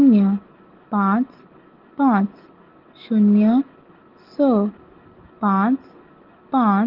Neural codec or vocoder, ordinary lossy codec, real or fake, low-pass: none; Opus, 16 kbps; real; 5.4 kHz